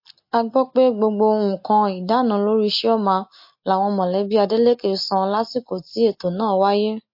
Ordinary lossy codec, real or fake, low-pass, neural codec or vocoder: MP3, 32 kbps; real; 5.4 kHz; none